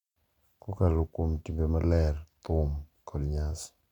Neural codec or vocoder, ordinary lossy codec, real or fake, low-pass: none; none; real; 19.8 kHz